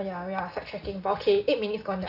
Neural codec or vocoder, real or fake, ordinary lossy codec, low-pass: none; real; none; 5.4 kHz